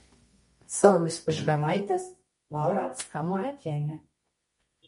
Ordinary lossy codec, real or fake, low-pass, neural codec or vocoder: MP3, 48 kbps; fake; 10.8 kHz; codec, 24 kHz, 0.9 kbps, WavTokenizer, medium music audio release